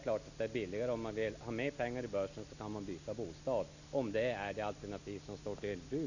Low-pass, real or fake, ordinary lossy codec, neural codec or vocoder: 7.2 kHz; real; none; none